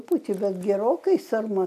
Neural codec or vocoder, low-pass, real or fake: none; 14.4 kHz; real